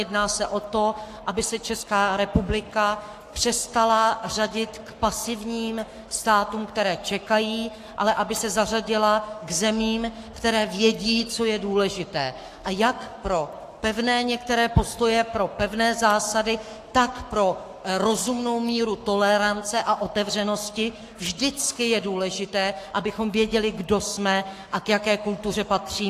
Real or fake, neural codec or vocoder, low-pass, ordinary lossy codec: fake; codec, 44.1 kHz, 7.8 kbps, Pupu-Codec; 14.4 kHz; AAC, 64 kbps